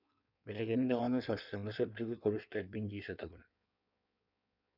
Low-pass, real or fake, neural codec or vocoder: 5.4 kHz; fake; codec, 16 kHz in and 24 kHz out, 1.1 kbps, FireRedTTS-2 codec